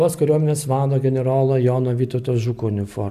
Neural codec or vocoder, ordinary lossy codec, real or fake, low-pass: none; AAC, 96 kbps; real; 14.4 kHz